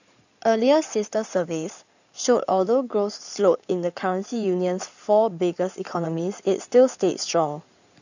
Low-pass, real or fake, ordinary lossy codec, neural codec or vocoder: 7.2 kHz; fake; none; codec, 16 kHz in and 24 kHz out, 2.2 kbps, FireRedTTS-2 codec